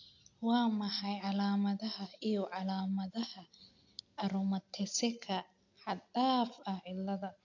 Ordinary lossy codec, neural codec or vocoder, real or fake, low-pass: AAC, 48 kbps; none; real; 7.2 kHz